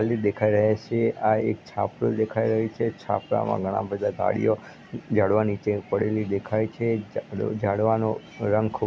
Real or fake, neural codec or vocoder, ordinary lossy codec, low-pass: real; none; none; none